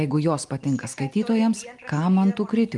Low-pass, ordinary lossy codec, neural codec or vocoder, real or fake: 10.8 kHz; Opus, 32 kbps; none; real